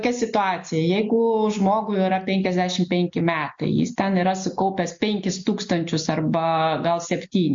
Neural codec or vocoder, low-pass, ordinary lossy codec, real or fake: none; 7.2 kHz; MP3, 48 kbps; real